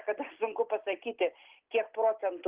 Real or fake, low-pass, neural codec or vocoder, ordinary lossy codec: real; 3.6 kHz; none; Opus, 16 kbps